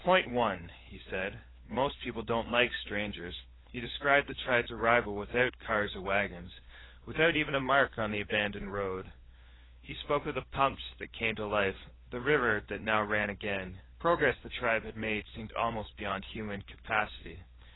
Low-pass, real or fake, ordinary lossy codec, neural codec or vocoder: 7.2 kHz; fake; AAC, 16 kbps; codec, 16 kHz, 4 kbps, FunCodec, trained on LibriTTS, 50 frames a second